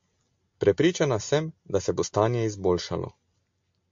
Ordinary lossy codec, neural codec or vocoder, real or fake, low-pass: MP3, 48 kbps; none; real; 7.2 kHz